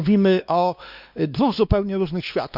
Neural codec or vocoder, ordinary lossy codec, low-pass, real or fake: codec, 16 kHz, 2 kbps, X-Codec, WavLM features, trained on Multilingual LibriSpeech; none; 5.4 kHz; fake